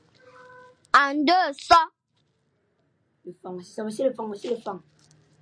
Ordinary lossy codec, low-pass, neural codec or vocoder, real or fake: MP3, 64 kbps; 9.9 kHz; none; real